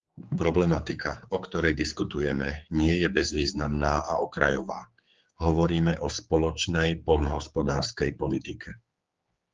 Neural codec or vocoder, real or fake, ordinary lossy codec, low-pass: codec, 16 kHz, 4 kbps, X-Codec, HuBERT features, trained on general audio; fake; Opus, 32 kbps; 7.2 kHz